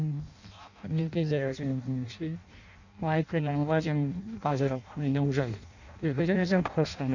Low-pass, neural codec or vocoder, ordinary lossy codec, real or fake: 7.2 kHz; codec, 16 kHz in and 24 kHz out, 0.6 kbps, FireRedTTS-2 codec; none; fake